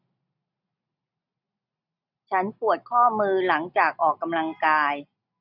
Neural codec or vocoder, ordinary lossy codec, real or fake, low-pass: none; none; real; 5.4 kHz